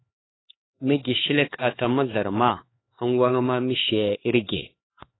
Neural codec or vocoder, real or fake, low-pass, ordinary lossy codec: codec, 16 kHz, 4 kbps, X-Codec, HuBERT features, trained on LibriSpeech; fake; 7.2 kHz; AAC, 16 kbps